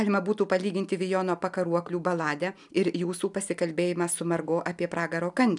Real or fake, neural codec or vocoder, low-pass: real; none; 10.8 kHz